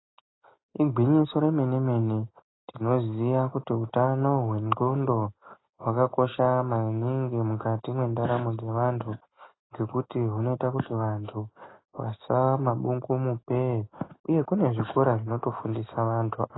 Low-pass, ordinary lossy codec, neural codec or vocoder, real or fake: 7.2 kHz; AAC, 16 kbps; none; real